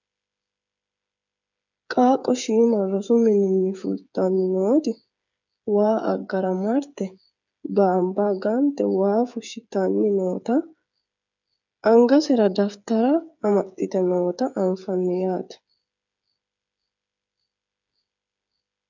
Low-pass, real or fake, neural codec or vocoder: 7.2 kHz; fake; codec, 16 kHz, 8 kbps, FreqCodec, smaller model